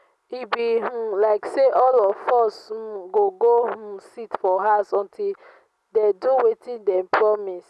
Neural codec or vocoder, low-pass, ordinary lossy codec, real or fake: none; none; none; real